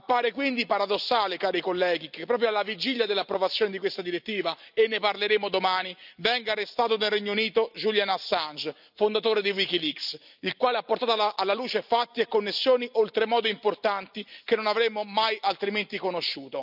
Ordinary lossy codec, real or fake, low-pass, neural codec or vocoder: none; real; 5.4 kHz; none